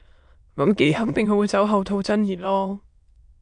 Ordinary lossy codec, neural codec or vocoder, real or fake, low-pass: AAC, 64 kbps; autoencoder, 22.05 kHz, a latent of 192 numbers a frame, VITS, trained on many speakers; fake; 9.9 kHz